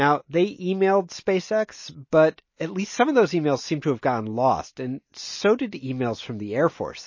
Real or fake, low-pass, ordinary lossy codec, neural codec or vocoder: real; 7.2 kHz; MP3, 32 kbps; none